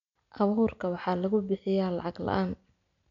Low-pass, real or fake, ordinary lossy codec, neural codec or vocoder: 7.2 kHz; real; none; none